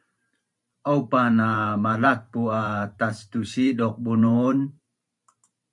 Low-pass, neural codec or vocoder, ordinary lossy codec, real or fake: 10.8 kHz; vocoder, 44.1 kHz, 128 mel bands every 512 samples, BigVGAN v2; MP3, 96 kbps; fake